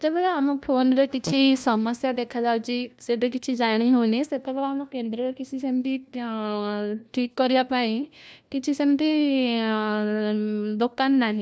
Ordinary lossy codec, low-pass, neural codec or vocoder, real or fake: none; none; codec, 16 kHz, 1 kbps, FunCodec, trained on LibriTTS, 50 frames a second; fake